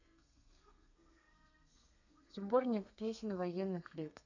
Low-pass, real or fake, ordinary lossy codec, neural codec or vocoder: 7.2 kHz; fake; none; codec, 32 kHz, 1.9 kbps, SNAC